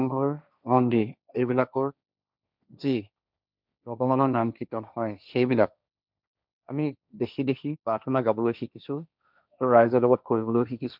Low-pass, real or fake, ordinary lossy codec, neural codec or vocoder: 5.4 kHz; fake; none; codec, 16 kHz, 1.1 kbps, Voila-Tokenizer